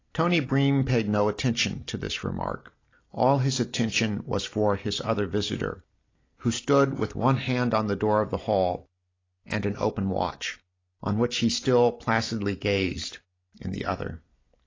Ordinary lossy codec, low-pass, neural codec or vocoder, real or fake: AAC, 32 kbps; 7.2 kHz; none; real